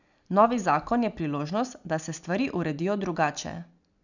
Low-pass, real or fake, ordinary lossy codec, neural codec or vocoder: 7.2 kHz; real; none; none